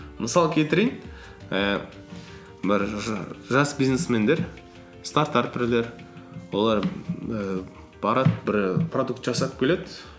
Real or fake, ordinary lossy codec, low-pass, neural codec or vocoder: real; none; none; none